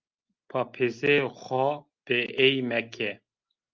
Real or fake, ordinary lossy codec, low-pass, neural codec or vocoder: real; Opus, 24 kbps; 7.2 kHz; none